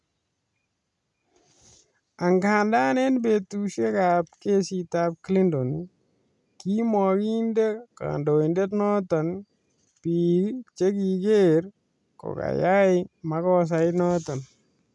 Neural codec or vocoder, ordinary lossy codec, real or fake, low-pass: none; none; real; 10.8 kHz